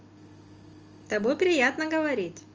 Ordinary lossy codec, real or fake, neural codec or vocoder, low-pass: Opus, 24 kbps; real; none; 7.2 kHz